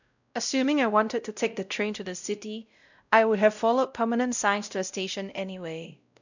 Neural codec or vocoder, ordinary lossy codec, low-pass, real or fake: codec, 16 kHz, 0.5 kbps, X-Codec, WavLM features, trained on Multilingual LibriSpeech; none; 7.2 kHz; fake